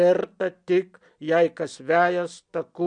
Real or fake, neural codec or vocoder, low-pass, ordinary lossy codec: real; none; 9.9 kHz; MP3, 64 kbps